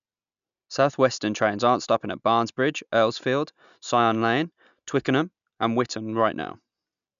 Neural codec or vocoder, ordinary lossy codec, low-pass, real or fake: none; none; 7.2 kHz; real